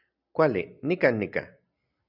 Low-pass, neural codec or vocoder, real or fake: 5.4 kHz; none; real